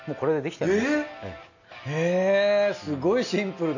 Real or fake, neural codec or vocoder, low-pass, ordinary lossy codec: real; none; 7.2 kHz; none